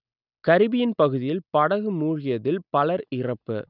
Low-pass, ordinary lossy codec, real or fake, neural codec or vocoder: 5.4 kHz; none; real; none